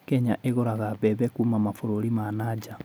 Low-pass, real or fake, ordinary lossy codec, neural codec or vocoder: none; real; none; none